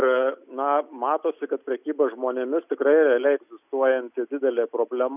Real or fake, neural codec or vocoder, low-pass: real; none; 3.6 kHz